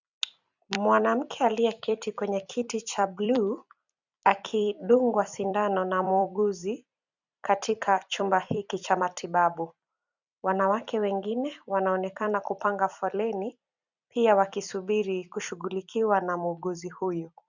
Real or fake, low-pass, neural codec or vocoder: fake; 7.2 kHz; vocoder, 44.1 kHz, 128 mel bands every 256 samples, BigVGAN v2